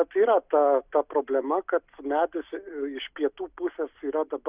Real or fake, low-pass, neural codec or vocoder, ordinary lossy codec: real; 3.6 kHz; none; Opus, 64 kbps